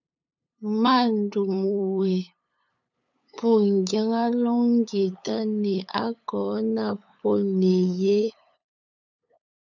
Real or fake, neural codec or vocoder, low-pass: fake; codec, 16 kHz, 8 kbps, FunCodec, trained on LibriTTS, 25 frames a second; 7.2 kHz